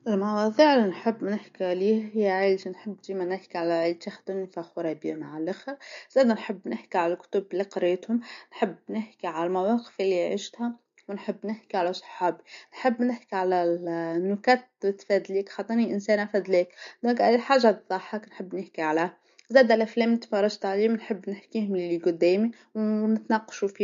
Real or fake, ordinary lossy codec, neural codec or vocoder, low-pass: real; MP3, 48 kbps; none; 7.2 kHz